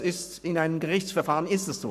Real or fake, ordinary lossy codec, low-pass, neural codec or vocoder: fake; none; 14.4 kHz; codec, 44.1 kHz, 7.8 kbps, Pupu-Codec